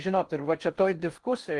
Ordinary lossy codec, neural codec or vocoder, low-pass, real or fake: Opus, 24 kbps; codec, 16 kHz in and 24 kHz out, 0.6 kbps, FocalCodec, streaming, 4096 codes; 10.8 kHz; fake